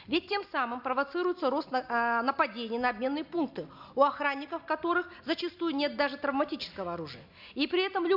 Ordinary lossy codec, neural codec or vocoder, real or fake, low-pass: MP3, 48 kbps; none; real; 5.4 kHz